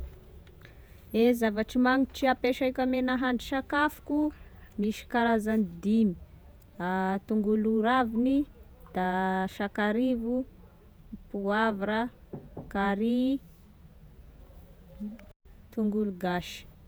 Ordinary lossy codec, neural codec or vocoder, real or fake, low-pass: none; vocoder, 48 kHz, 128 mel bands, Vocos; fake; none